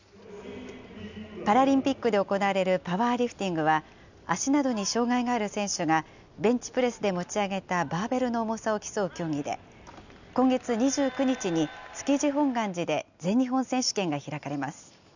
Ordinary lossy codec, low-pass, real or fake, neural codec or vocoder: none; 7.2 kHz; real; none